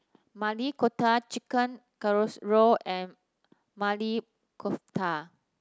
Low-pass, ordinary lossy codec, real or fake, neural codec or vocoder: none; none; real; none